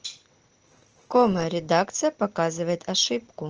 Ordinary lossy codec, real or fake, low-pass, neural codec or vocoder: Opus, 16 kbps; real; 7.2 kHz; none